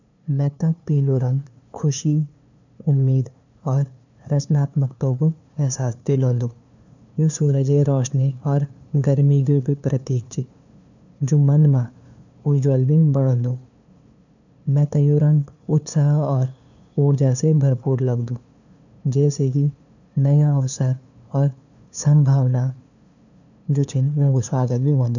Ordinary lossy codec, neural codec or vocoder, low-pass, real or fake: none; codec, 16 kHz, 2 kbps, FunCodec, trained on LibriTTS, 25 frames a second; 7.2 kHz; fake